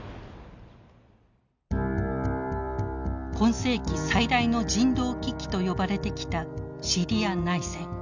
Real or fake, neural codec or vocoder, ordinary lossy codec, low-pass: real; none; none; 7.2 kHz